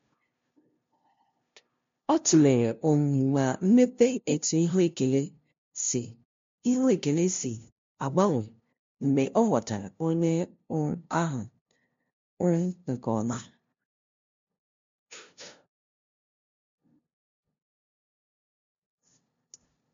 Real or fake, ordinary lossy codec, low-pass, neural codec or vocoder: fake; MP3, 48 kbps; 7.2 kHz; codec, 16 kHz, 0.5 kbps, FunCodec, trained on LibriTTS, 25 frames a second